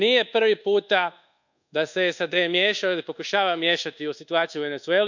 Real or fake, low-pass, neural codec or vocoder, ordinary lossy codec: fake; 7.2 kHz; codec, 24 kHz, 1.2 kbps, DualCodec; none